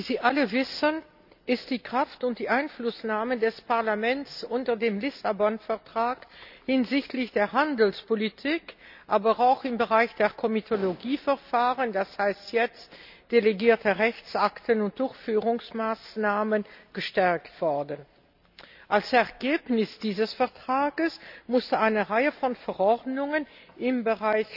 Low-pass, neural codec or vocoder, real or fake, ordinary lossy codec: 5.4 kHz; none; real; none